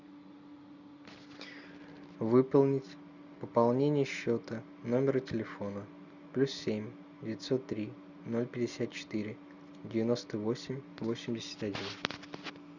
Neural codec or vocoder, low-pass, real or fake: none; 7.2 kHz; real